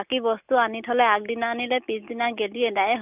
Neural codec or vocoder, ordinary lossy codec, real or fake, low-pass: none; none; real; 3.6 kHz